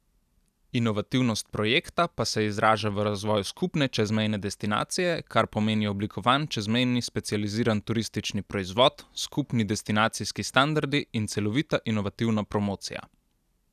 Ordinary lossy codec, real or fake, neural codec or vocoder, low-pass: none; real; none; 14.4 kHz